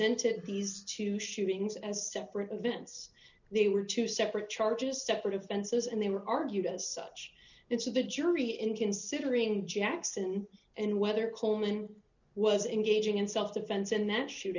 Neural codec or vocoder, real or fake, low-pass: none; real; 7.2 kHz